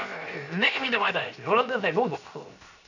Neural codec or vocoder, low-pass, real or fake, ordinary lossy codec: codec, 16 kHz, 0.7 kbps, FocalCodec; 7.2 kHz; fake; none